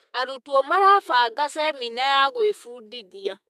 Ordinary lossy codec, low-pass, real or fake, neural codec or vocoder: MP3, 96 kbps; 14.4 kHz; fake; codec, 32 kHz, 1.9 kbps, SNAC